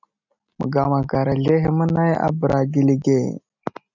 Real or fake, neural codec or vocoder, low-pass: real; none; 7.2 kHz